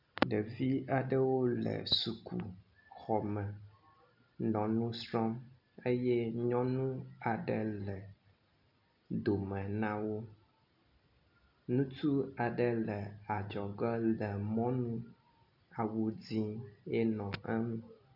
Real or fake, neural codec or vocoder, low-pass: real; none; 5.4 kHz